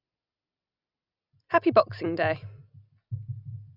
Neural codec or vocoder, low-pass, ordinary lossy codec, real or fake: none; 5.4 kHz; none; real